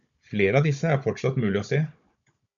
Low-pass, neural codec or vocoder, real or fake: 7.2 kHz; codec, 16 kHz, 16 kbps, FunCodec, trained on Chinese and English, 50 frames a second; fake